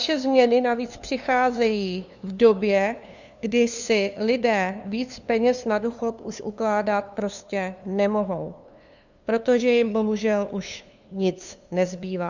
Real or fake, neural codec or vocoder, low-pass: fake; codec, 16 kHz, 2 kbps, FunCodec, trained on LibriTTS, 25 frames a second; 7.2 kHz